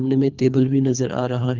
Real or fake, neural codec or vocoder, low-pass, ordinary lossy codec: fake; codec, 24 kHz, 3 kbps, HILCodec; 7.2 kHz; Opus, 24 kbps